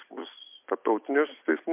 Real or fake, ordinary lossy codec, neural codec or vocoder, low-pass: real; MP3, 32 kbps; none; 3.6 kHz